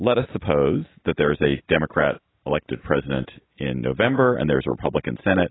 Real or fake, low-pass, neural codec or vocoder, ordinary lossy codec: real; 7.2 kHz; none; AAC, 16 kbps